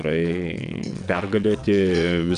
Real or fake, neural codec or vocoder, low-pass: fake; vocoder, 22.05 kHz, 80 mel bands, WaveNeXt; 9.9 kHz